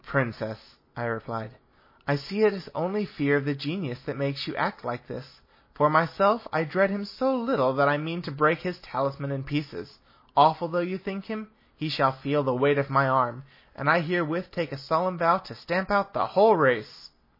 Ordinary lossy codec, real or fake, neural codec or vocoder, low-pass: MP3, 24 kbps; real; none; 5.4 kHz